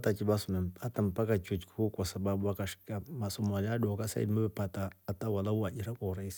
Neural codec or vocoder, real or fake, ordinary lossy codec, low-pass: none; real; none; none